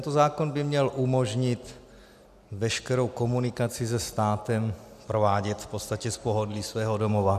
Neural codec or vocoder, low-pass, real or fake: none; 14.4 kHz; real